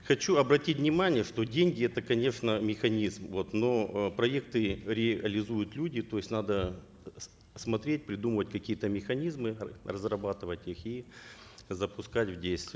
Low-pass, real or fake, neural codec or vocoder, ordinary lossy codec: none; real; none; none